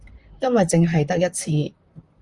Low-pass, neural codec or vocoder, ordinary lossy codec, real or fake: 10.8 kHz; vocoder, 44.1 kHz, 128 mel bands, Pupu-Vocoder; Opus, 32 kbps; fake